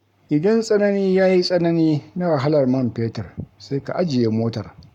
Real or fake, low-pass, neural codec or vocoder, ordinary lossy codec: fake; 19.8 kHz; codec, 44.1 kHz, 7.8 kbps, Pupu-Codec; none